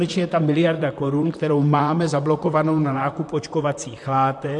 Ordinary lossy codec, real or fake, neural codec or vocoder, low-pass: MP3, 64 kbps; fake; vocoder, 44.1 kHz, 128 mel bands, Pupu-Vocoder; 10.8 kHz